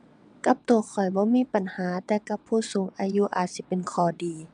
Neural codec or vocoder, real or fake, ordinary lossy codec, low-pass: vocoder, 22.05 kHz, 80 mel bands, WaveNeXt; fake; none; 9.9 kHz